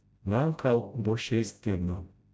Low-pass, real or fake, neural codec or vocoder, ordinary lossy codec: none; fake; codec, 16 kHz, 0.5 kbps, FreqCodec, smaller model; none